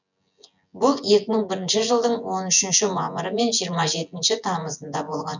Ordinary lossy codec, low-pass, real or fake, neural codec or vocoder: none; 7.2 kHz; fake; vocoder, 24 kHz, 100 mel bands, Vocos